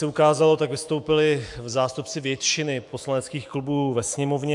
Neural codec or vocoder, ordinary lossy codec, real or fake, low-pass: autoencoder, 48 kHz, 128 numbers a frame, DAC-VAE, trained on Japanese speech; AAC, 64 kbps; fake; 10.8 kHz